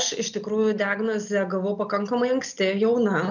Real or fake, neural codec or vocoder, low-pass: real; none; 7.2 kHz